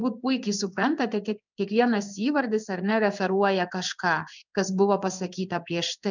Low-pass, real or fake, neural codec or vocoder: 7.2 kHz; fake; codec, 16 kHz in and 24 kHz out, 1 kbps, XY-Tokenizer